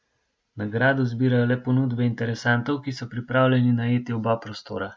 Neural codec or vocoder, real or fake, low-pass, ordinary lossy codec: none; real; none; none